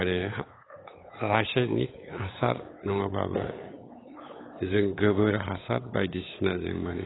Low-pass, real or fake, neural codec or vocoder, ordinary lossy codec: 7.2 kHz; fake; codec, 16 kHz, 8 kbps, FunCodec, trained on LibriTTS, 25 frames a second; AAC, 16 kbps